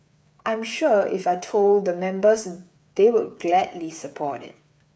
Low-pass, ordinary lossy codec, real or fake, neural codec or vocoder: none; none; fake; codec, 16 kHz, 8 kbps, FreqCodec, smaller model